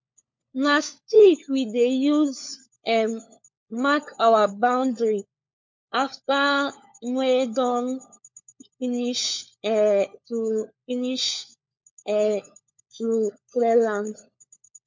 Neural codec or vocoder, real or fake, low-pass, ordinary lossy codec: codec, 16 kHz, 4 kbps, FunCodec, trained on LibriTTS, 50 frames a second; fake; 7.2 kHz; MP3, 48 kbps